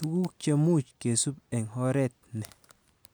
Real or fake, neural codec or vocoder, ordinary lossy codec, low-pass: real; none; none; none